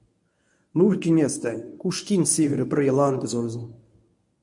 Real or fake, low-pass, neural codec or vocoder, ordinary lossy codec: fake; 10.8 kHz; codec, 24 kHz, 0.9 kbps, WavTokenizer, medium speech release version 1; MP3, 64 kbps